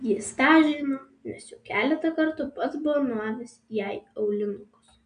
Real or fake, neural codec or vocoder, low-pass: real; none; 9.9 kHz